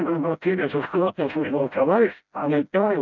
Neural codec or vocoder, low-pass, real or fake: codec, 16 kHz, 0.5 kbps, FreqCodec, smaller model; 7.2 kHz; fake